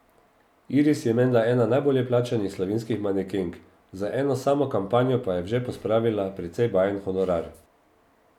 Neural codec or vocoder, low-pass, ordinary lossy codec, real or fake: none; 19.8 kHz; none; real